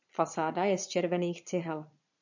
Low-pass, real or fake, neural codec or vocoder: 7.2 kHz; fake; vocoder, 22.05 kHz, 80 mel bands, Vocos